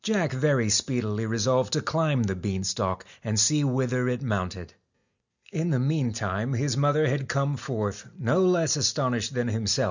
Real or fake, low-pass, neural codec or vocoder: real; 7.2 kHz; none